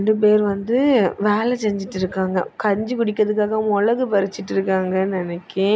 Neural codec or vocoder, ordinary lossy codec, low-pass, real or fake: none; none; none; real